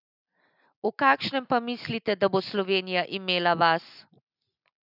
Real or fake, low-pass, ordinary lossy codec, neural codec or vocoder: real; 5.4 kHz; none; none